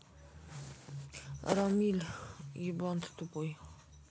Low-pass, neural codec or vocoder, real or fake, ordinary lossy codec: none; none; real; none